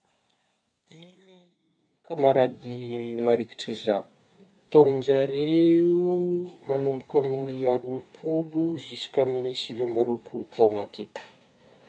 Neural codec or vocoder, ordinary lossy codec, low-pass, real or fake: codec, 24 kHz, 1 kbps, SNAC; none; 9.9 kHz; fake